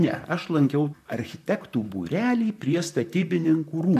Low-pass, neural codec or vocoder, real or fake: 14.4 kHz; vocoder, 44.1 kHz, 128 mel bands, Pupu-Vocoder; fake